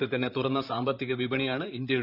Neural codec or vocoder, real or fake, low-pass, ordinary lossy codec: vocoder, 44.1 kHz, 128 mel bands, Pupu-Vocoder; fake; 5.4 kHz; none